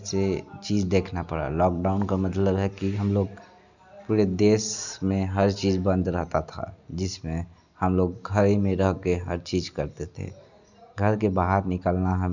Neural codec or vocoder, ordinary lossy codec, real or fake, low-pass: none; none; real; 7.2 kHz